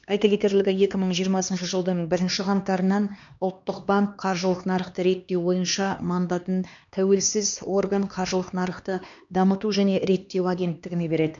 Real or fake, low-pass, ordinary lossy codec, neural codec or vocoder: fake; 7.2 kHz; MP3, 64 kbps; codec, 16 kHz, 2 kbps, X-Codec, HuBERT features, trained on LibriSpeech